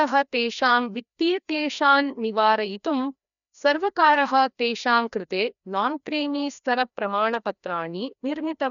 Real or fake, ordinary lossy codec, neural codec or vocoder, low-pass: fake; none; codec, 16 kHz, 1 kbps, FreqCodec, larger model; 7.2 kHz